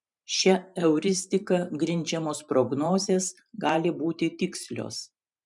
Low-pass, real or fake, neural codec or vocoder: 10.8 kHz; fake; vocoder, 44.1 kHz, 128 mel bands every 512 samples, BigVGAN v2